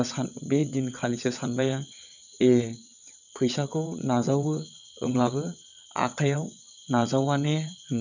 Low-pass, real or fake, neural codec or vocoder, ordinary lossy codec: 7.2 kHz; fake; vocoder, 22.05 kHz, 80 mel bands, WaveNeXt; none